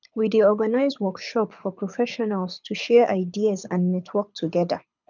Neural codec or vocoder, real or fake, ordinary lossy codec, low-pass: codec, 24 kHz, 6 kbps, HILCodec; fake; none; 7.2 kHz